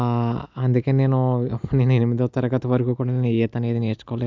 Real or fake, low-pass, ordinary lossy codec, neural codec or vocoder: real; 7.2 kHz; MP3, 64 kbps; none